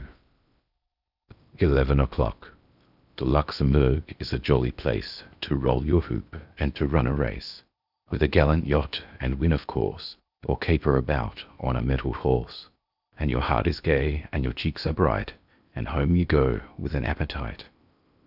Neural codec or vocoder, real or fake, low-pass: codec, 16 kHz in and 24 kHz out, 0.8 kbps, FocalCodec, streaming, 65536 codes; fake; 5.4 kHz